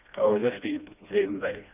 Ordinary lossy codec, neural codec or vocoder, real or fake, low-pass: none; codec, 16 kHz, 1 kbps, FreqCodec, smaller model; fake; 3.6 kHz